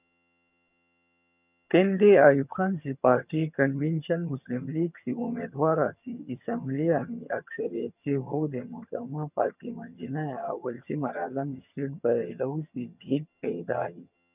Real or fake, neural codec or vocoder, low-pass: fake; vocoder, 22.05 kHz, 80 mel bands, HiFi-GAN; 3.6 kHz